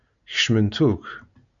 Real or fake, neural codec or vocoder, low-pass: real; none; 7.2 kHz